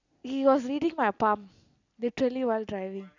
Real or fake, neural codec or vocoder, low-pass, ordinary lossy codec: real; none; 7.2 kHz; none